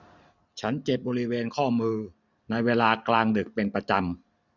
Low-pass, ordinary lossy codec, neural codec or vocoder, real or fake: 7.2 kHz; none; none; real